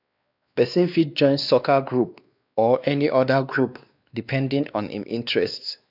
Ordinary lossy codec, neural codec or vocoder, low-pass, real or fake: none; codec, 16 kHz, 2 kbps, X-Codec, WavLM features, trained on Multilingual LibriSpeech; 5.4 kHz; fake